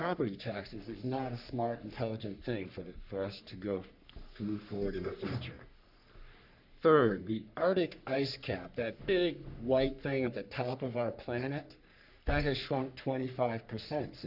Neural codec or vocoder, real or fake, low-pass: codec, 44.1 kHz, 3.4 kbps, Pupu-Codec; fake; 5.4 kHz